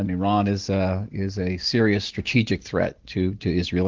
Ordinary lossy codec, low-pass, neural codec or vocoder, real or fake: Opus, 16 kbps; 7.2 kHz; none; real